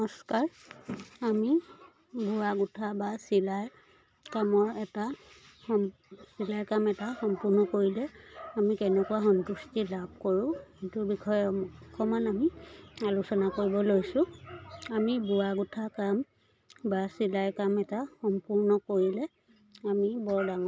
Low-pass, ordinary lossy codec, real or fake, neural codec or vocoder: none; none; real; none